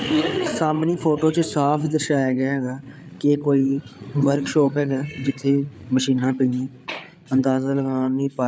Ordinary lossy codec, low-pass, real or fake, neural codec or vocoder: none; none; fake; codec, 16 kHz, 8 kbps, FreqCodec, larger model